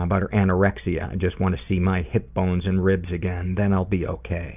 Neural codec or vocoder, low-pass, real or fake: none; 3.6 kHz; real